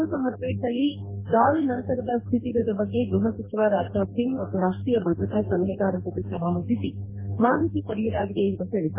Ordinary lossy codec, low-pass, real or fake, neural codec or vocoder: MP3, 16 kbps; 3.6 kHz; fake; codec, 44.1 kHz, 2.6 kbps, DAC